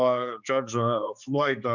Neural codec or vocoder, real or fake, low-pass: codec, 16 kHz, 4 kbps, X-Codec, HuBERT features, trained on general audio; fake; 7.2 kHz